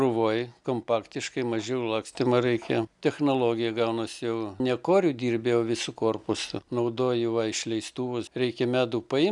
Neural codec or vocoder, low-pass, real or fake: none; 10.8 kHz; real